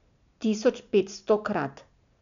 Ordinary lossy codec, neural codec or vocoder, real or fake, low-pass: none; none; real; 7.2 kHz